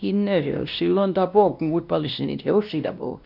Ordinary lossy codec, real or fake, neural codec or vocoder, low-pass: none; fake; codec, 16 kHz, 1 kbps, X-Codec, WavLM features, trained on Multilingual LibriSpeech; 5.4 kHz